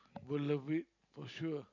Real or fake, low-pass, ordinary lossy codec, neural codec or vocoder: real; 7.2 kHz; none; none